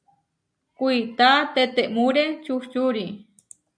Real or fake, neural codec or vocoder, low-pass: real; none; 9.9 kHz